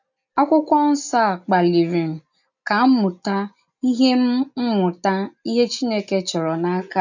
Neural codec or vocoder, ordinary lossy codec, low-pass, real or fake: none; none; 7.2 kHz; real